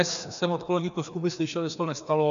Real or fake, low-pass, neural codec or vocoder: fake; 7.2 kHz; codec, 16 kHz, 2 kbps, FreqCodec, larger model